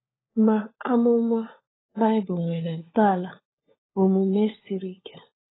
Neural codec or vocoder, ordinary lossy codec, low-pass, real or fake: codec, 16 kHz, 4 kbps, FunCodec, trained on LibriTTS, 50 frames a second; AAC, 16 kbps; 7.2 kHz; fake